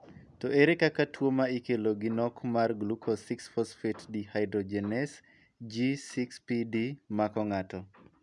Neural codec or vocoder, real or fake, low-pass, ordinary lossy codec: none; real; 10.8 kHz; none